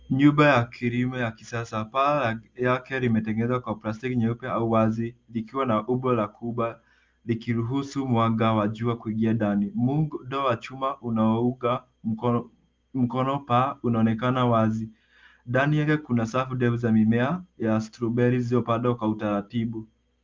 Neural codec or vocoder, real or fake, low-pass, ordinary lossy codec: none; real; 7.2 kHz; Opus, 32 kbps